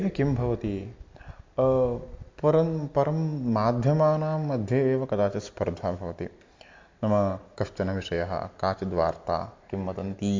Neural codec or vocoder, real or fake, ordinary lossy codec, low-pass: vocoder, 44.1 kHz, 128 mel bands every 256 samples, BigVGAN v2; fake; MP3, 48 kbps; 7.2 kHz